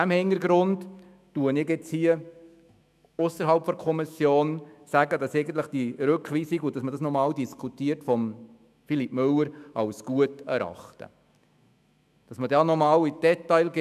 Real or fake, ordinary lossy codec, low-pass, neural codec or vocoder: fake; none; 14.4 kHz; autoencoder, 48 kHz, 128 numbers a frame, DAC-VAE, trained on Japanese speech